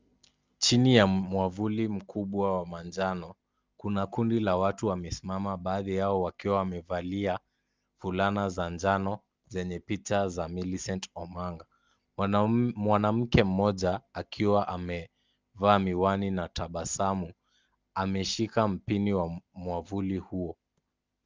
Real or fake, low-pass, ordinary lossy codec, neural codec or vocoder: real; 7.2 kHz; Opus, 32 kbps; none